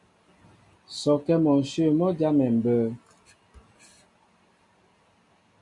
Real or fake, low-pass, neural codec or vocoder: real; 10.8 kHz; none